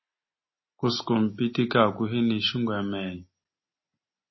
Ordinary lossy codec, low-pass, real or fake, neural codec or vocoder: MP3, 24 kbps; 7.2 kHz; real; none